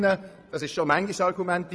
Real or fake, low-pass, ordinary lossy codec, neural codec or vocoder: fake; none; none; vocoder, 22.05 kHz, 80 mel bands, Vocos